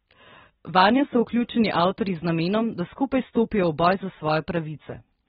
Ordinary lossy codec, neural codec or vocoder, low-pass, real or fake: AAC, 16 kbps; none; 19.8 kHz; real